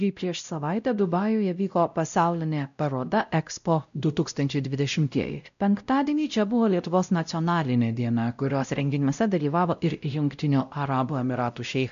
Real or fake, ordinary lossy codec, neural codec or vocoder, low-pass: fake; MP3, 96 kbps; codec, 16 kHz, 0.5 kbps, X-Codec, WavLM features, trained on Multilingual LibriSpeech; 7.2 kHz